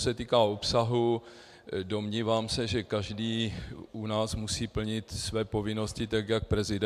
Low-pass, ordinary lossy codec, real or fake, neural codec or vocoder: 14.4 kHz; MP3, 96 kbps; real; none